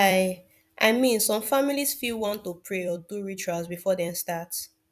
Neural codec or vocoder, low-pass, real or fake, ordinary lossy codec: vocoder, 44.1 kHz, 128 mel bands every 256 samples, BigVGAN v2; 14.4 kHz; fake; none